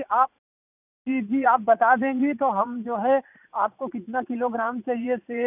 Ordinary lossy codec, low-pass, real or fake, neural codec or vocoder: none; 3.6 kHz; fake; vocoder, 44.1 kHz, 80 mel bands, Vocos